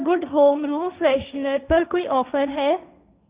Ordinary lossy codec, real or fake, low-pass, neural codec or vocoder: Opus, 64 kbps; fake; 3.6 kHz; codec, 16 kHz, 1.1 kbps, Voila-Tokenizer